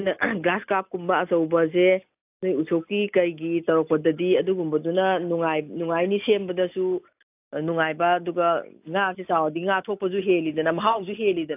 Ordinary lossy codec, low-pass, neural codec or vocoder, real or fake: AAC, 32 kbps; 3.6 kHz; none; real